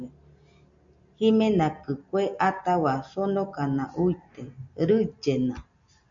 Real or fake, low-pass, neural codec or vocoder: real; 7.2 kHz; none